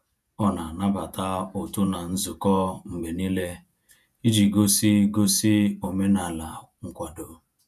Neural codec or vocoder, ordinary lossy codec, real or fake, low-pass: vocoder, 48 kHz, 128 mel bands, Vocos; none; fake; 14.4 kHz